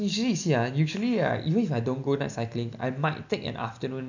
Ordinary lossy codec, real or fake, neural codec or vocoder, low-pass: none; real; none; 7.2 kHz